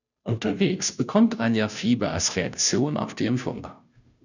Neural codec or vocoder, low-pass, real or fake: codec, 16 kHz, 0.5 kbps, FunCodec, trained on Chinese and English, 25 frames a second; 7.2 kHz; fake